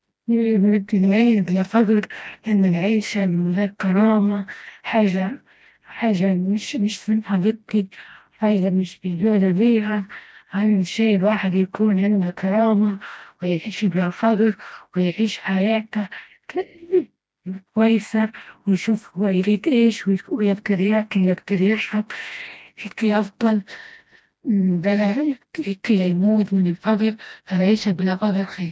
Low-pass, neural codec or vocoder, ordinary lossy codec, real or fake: none; codec, 16 kHz, 1 kbps, FreqCodec, smaller model; none; fake